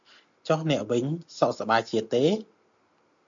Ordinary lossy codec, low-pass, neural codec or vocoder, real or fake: MP3, 64 kbps; 7.2 kHz; none; real